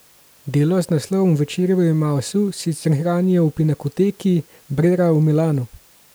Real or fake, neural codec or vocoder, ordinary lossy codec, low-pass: real; none; none; none